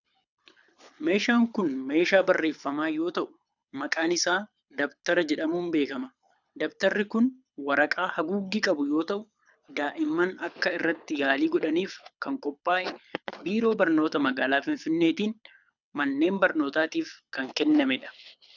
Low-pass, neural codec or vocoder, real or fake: 7.2 kHz; codec, 24 kHz, 6 kbps, HILCodec; fake